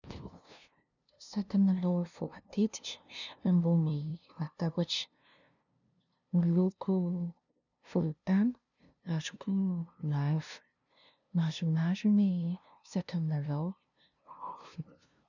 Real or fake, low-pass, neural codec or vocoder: fake; 7.2 kHz; codec, 16 kHz, 0.5 kbps, FunCodec, trained on LibriTTS, 25 frames a second